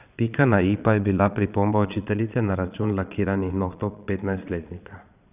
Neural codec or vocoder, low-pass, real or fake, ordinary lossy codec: vocoder, 22.05 kHz, 80 mel bands, Vocos; 3.6 kHz; fake; none